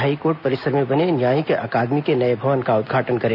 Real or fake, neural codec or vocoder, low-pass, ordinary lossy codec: real; none; 5.4 kHz; none